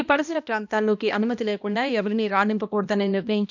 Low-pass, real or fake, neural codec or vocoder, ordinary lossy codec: 7.2 kHz; fake; codec, 16 kHz, 1 kbps, X-Codec, HuBERT features, trained on balanced general audio; none